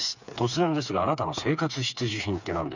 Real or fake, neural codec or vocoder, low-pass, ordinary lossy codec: fake; codec, 16 kHz, 4 kbps, FreqCodec, smaller model; 7.2 kHz; none